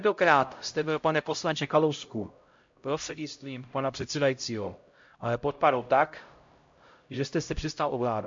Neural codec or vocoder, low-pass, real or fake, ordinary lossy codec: codec, 16 kHz, 0.5 kbps, X-Codec, HuBERT features, trained on LibriSpeech; 7.2 kHz; fake; MP3, 48 kbps